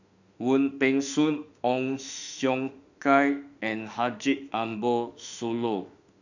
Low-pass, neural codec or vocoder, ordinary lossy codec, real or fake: 7.2 kHz; autoencoder, 48 kHz, 32 numbers a frame, DAC-VAE, trained on Japanese speech; none; fake